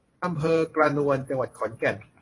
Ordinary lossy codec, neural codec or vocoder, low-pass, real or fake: AAC, 32 kbps; vocoder, 24 kHz, 100 mel bands, Vocos; 10.8 kHz; fake